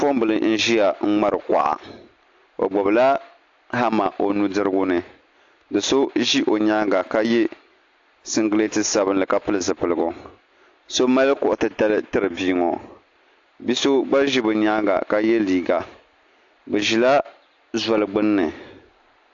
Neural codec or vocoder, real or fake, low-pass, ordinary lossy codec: none; real; 7.2 kHz; AAC, 48 kbps